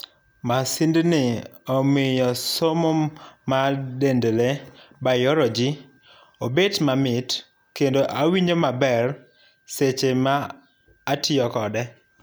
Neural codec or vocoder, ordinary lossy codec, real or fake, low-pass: none; none; real; none